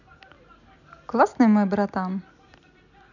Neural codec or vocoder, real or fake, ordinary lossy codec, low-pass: none; real; none; 7.2 kHz